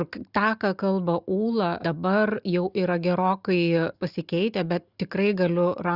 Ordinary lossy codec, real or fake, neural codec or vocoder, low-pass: Opus, 64 kbps; real; none; 5.4 kHz